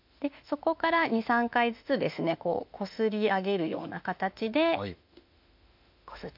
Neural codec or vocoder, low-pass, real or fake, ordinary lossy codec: autoencoder, 48 kHz, 32 numbers a frame, DAC-VAE, trained on Japanese speech; 5.4 kHz; fake; MP3, 48 kbps